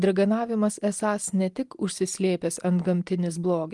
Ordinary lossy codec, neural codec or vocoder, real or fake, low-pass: Opus, 24 kbps; none; real; 10.8 kHz